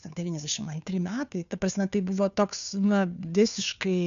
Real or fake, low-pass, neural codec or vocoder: fake; 7.2 kHz; codec, 16 kHz, 2 kbps, FunCodec, trained on Chinese and English, 25 frames a second